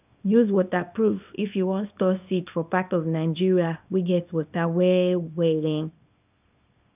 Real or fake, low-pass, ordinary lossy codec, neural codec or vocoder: fake; 3.6 kHz; none; codec, 24 kHz, 0.9 kbps, WavTokenizer, small release